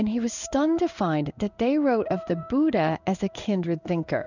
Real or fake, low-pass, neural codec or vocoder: fake; 7.2 kHz; codec, 16 kHz in and 24 kHz out, 1 kbps, XY-Tokenizer